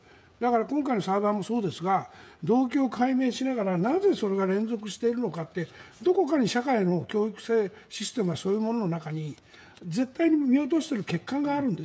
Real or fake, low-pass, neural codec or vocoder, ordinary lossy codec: fake; none; codec, 16 kHz, 16 kbps, FreqCodec, smaller model; none